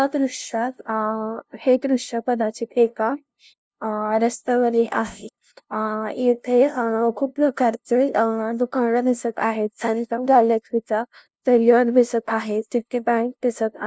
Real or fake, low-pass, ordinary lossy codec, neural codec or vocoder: fake; none; none; codec, 16 kHz, 0.5 kbps, FunCodec, trained on LibriTTS, 25 frames a second